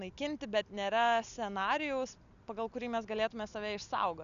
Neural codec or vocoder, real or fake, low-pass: none; real; 7.2 kHz